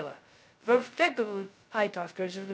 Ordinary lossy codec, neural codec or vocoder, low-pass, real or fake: none; codec, 16 kHz, 0.2 kbps, FocalCodec; none; fake